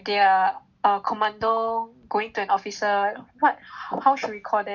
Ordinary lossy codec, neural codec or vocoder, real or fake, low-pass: none; none; real; 7.2 kHz